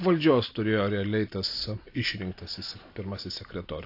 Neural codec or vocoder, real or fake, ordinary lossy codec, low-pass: none; real; AAC, 32 kbps; 5.4 kHz